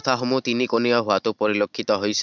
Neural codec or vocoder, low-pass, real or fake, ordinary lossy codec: none; 7.2 kHz; real; none